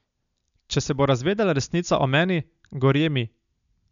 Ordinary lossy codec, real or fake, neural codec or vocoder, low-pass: MP3, 96 kbps; real; none; 7.2 kHz